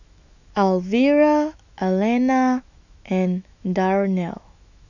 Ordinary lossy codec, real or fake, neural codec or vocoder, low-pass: none; fake; autoencoder, 48 kHz, 128 numbers a frame, DAC-VAE, trained on Japanese speech; 7.2 kHz